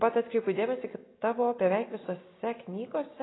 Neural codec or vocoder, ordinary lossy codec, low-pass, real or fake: none; AAC, 16 kbps; 7.2 kHz; real